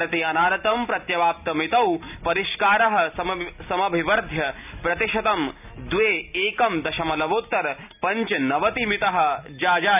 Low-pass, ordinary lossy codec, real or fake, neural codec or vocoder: 3.6 kHz; none; real; none